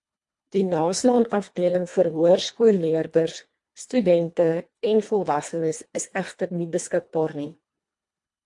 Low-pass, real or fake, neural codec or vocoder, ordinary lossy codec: 10.8 kHz; fake; codec, 24 kHz, 1.5 kbps, HILCodec; MP3, 64 kbps